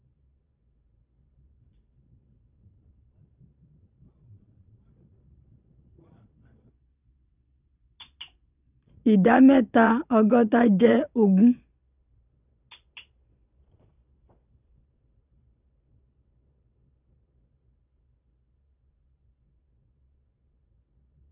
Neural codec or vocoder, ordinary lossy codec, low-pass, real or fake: vocoder, 22.05 kHz, 80 mel bands, Vocos; none; 3.6 kHz; fake